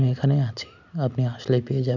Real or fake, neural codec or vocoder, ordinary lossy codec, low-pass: real; none; none; 7.2 kHz